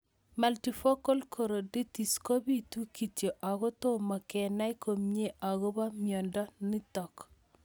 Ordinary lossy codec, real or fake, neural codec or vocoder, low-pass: none; real; none; none